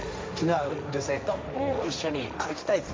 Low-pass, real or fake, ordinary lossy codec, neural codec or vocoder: none; fake; none; codec, 16 kHz, 1.1 kbps, Voila-Tokenizer